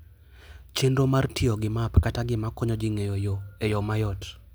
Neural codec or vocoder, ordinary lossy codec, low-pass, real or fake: none; none; none; real